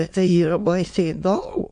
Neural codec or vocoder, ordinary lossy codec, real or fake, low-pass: autoencoder, 22.05 kHz, a latent of 192 numbers a frame, VITS, trained on many speakers; AAC, 64 kbps; fake; 9.9 kHz